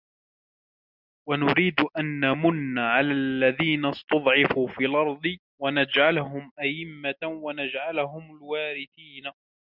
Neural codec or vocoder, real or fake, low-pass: none; real; 5.4 kHz